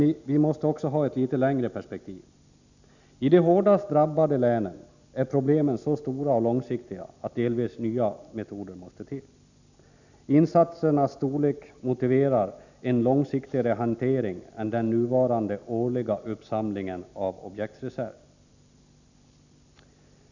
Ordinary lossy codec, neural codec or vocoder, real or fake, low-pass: none; none; real; 7.2 kHz